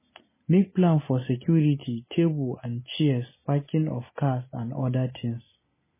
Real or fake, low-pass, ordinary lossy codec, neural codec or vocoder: real; 3.6 kHz; MP3, 16 kbps; none